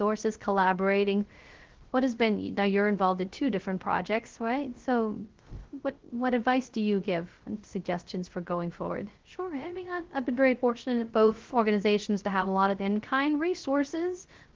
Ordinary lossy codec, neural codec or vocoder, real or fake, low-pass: Opus, 16 kbps; codec, 16 kHz, 0.3 kbps, FocalCodec; fake; 7.2 kHz